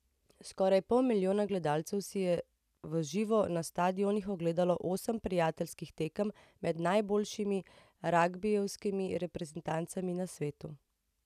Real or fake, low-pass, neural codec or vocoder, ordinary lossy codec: real; 14.4 kHz; none; none